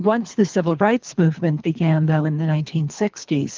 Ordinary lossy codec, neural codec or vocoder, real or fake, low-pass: Opus, 32 kbps; codec, 24 kHz, 3 kbps, HILCodec; fake; 7.2 kHz